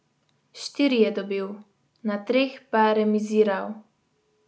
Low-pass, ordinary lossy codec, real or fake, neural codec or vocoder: none; none; real; none